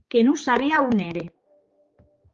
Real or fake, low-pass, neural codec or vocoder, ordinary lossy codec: fake; 7.2 kHz; codec, 16 kHz, 4 kbps, X-Codec, HuBERT features, trained on general audio; Opus, 32 kbps